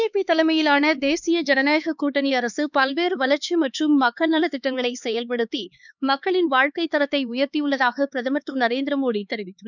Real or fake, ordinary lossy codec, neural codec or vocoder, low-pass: fake; none; codec, 16 kHz, 4 kbps, X-Codec, HuBERT features, trained on LibriSpeech; 7.2 kHz